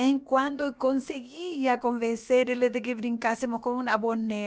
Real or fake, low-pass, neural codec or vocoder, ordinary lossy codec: fake; none; codec, 16 kHz, about 1 kbps, DyCAST, with the encoder's durations; none